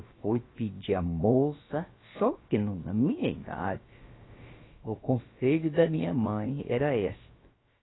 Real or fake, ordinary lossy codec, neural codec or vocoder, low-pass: fake; AAC, 16 kbps; codec, 16 kHz, about 1 kbps, DyCAST, with the encoder's durations; 7.2 kHz